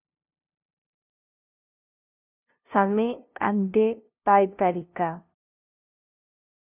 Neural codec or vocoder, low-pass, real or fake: codec, 16 kHz, 0.5 kbps, FunCodec, trained on LibriTTS, 25 frames a second; 3.6 kHz; fake